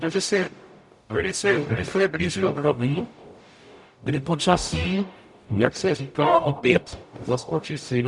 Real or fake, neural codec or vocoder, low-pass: fake; codec, 44.1 kHz, 0.9 kbps, DAC; 10.8 kHz